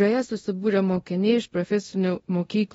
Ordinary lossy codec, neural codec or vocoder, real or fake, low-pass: AAC, 24 kbps; codec, 24 kHz, 0.5 kbps, DualCodec; fake; 10.8 kHz